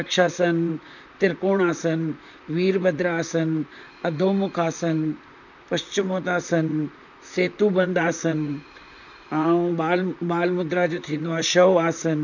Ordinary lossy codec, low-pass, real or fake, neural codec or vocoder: none; 7.2 kHz; fake; vocoder, 44.1 kHz, 128 mel bands, Pupu-Vocoder